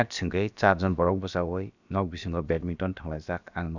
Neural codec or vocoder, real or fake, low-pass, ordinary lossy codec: codec, 16 kHz, about 1 kbps, DyCAST, with the encoder's durations; fake; 7.2 kHz; none